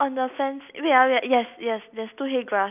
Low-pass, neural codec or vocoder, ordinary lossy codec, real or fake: 3.6 kHz; none; none; real